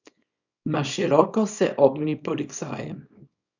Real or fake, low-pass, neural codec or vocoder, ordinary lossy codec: fake; 7.2 kHz; codec, 24 kHz, 0.9 kbps, WavTokenizer, small release; none